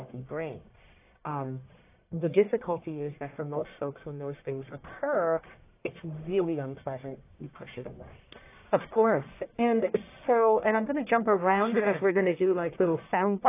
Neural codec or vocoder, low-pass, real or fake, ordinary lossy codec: codec, 44.1 kHz, 1.7 kbps, Pupu-Codec; 3.6 kHz; fake; AAC, 24 kbps